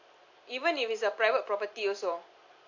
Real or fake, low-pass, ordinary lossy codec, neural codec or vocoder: real; 7.2 kHz; AAC, 48 kbps; none